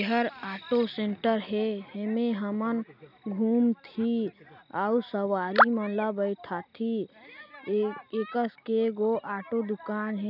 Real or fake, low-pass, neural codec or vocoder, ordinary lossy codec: real; 5.4 kHz; none; none